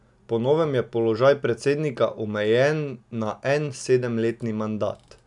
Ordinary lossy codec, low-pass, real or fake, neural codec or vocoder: none; 10.8 kHz; real; none